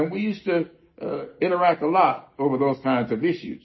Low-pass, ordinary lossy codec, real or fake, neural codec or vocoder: 7.2 kHz; MP3, 24 kbps; real; none